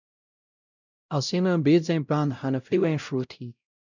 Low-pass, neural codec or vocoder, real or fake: 7.2 kHz; codec, 16 kHz, 0.5 kbps, X-Codec, WavLM features, trained on Multilingual LibriSpeech; fake